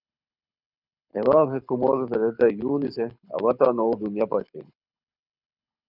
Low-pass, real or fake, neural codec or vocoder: 5.4 kHz; fake; codec, 24 kHz, 6 kbps, HILCodec